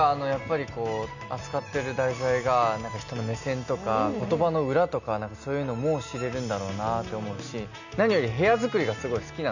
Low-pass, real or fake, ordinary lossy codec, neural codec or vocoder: 7.2 kHz; real; none; none